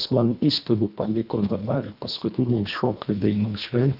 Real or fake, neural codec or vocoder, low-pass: fake; codec, 24 kHz, 1.5 kbps, HILCodec; 5.4 kHz